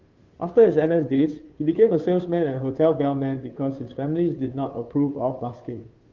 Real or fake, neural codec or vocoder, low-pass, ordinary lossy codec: fake; codec, 16 kHz, 2 kbps, FunCodec, trained on Chinese and English, 25 frames a second; 7.2 kHz; Opus, 32 kbps